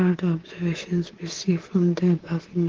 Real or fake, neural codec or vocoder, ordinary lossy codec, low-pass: fake; codec, 24 kHz, 3.1 kbps, DualCodec; Opus, 16 kbps; 7.2 kHz